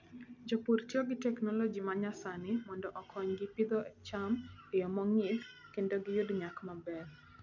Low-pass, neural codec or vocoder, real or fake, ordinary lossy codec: 7.2 kHz; none; real; none